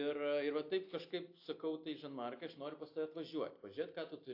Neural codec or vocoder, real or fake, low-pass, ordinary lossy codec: none; real; 5.4 kHz; AAC, 32 kbps